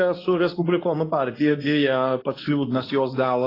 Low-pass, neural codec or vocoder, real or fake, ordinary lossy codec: 5.4 kHz; codec, 24 kHz, 0.9 kbps, WavTokenizer, medium speech release version 2; fake; AAC, 24 kbps